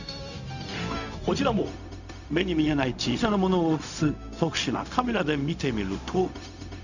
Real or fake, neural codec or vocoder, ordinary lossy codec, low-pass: fake; codec, 16 kHz, 0.4 kbps, LongCat-Audio-Codec; none; 7.2 kHz